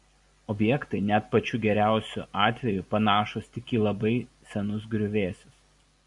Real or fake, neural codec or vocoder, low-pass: real; none; 10.8 kHz